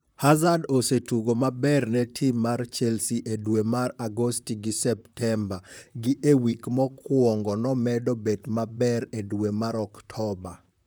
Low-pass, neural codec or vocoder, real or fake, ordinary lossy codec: none; vocoder, 44.1 kHz, 128 mel bands, Pupu-Vocoder; fake; none